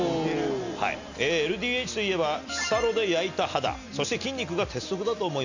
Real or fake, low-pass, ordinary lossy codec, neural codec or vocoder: real; 7.2 kHz; none; none